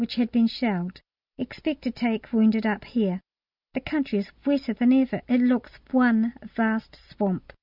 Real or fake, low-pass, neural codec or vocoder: real; 5.4 kHz; none